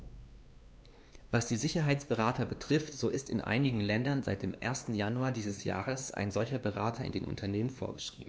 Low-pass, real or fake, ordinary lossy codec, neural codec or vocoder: none; fake; none; codec, 16 kHz, 2 kbps, X-Codec, WavLM features, trained on Multilingual LibriSpeech